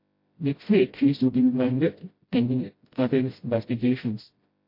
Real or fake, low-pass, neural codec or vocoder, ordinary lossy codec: fake; 5.4 kHz; codec, 16 kHz, 0.5 kbps, FreqCodec, smaller model; MP3, 32 kbps